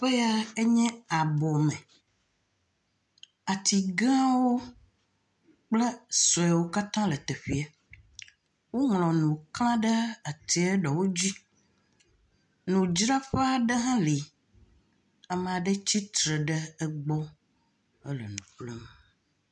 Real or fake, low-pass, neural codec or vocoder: real; 10.8 kHz; none